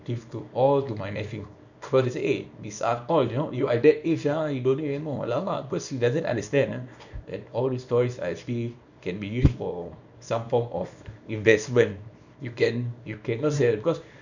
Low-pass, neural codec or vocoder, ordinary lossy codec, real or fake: 7.2 kHz; codec, 24 kHz, 0.9 kbps, WavTokenizer, small release; none; fake